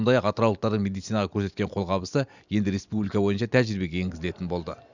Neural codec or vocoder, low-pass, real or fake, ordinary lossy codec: none; 7.2 kHz; real; none